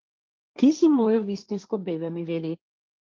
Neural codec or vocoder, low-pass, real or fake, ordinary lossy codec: codec, 16 kHz, 1.1 kbps, Voila-Tokenizer; 7.2 kHz; fake; Opus, 24 kbps